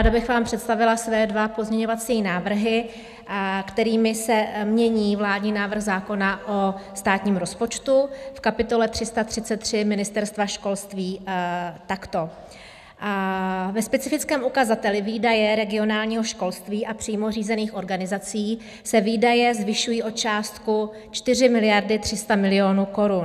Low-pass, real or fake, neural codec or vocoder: 14.4 kHz; real; none